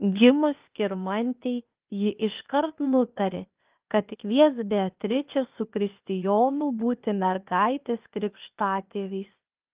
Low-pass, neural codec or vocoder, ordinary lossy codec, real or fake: 3.6 kHz; codec, 16 kHz, 0.8 kbps, ZipCodec; Opus, 24 kbps; fake